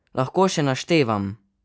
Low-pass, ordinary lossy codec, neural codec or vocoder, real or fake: none; none; none; real